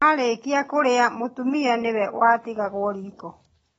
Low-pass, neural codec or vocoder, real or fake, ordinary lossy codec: 19.8 kHz; none; real; AAC, 24 kbps